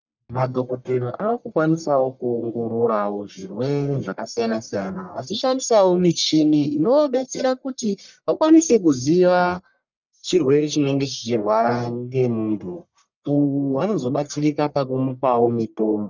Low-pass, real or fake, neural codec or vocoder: 7.2 kHz; fake; codec, 44.1 kHz, 1.7 kbps, Pupu-Codec